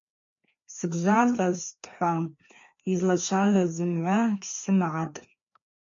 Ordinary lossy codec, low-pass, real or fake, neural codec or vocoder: MP3, 48 kbps; 7.2 kHz; fake; codec, 16 kHz, 2 kbps, FreqCodec, larger model